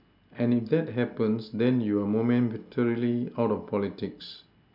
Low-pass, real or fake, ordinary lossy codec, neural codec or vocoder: 5.4 kHz; real; none; none